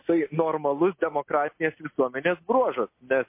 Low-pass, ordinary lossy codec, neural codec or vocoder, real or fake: 3.6 kHz; MP3, 24 kbps; none; real